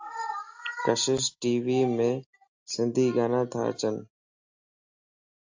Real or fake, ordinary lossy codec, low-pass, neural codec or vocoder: real; AAC, 48 kbps; 7.2 kHz; none